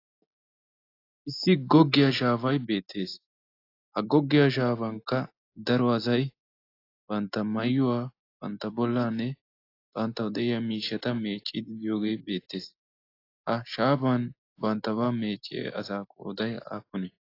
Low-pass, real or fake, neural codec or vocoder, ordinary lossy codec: 5.4 kHz; real; none; AAC, 32 kbps